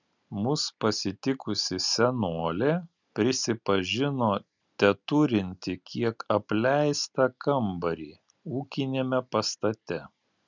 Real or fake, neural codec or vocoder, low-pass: real; none; 7.2 kHz